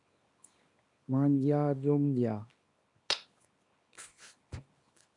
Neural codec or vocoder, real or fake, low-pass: codec, 24 kHz, 0.9 kbps, WavTokenizer, small release; fake; 10.8 kHz